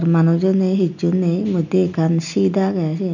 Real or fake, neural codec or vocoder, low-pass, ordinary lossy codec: real; none; 7.2 kHz; none